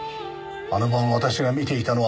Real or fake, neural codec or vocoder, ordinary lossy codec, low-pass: real; none; none; none